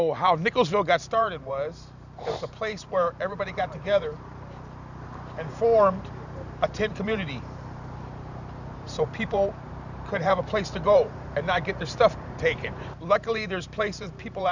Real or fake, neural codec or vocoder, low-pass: fake; vocoder, 44.1 kHz, 128 mel bands every 512 samples, BigVGAN v2; 7.2 kHz